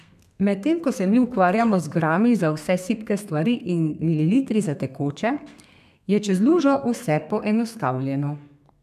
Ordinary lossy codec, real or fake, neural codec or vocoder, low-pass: none; fake; codec, 32 kHz, 1.9 kbps, SNAC; 14.4 kHz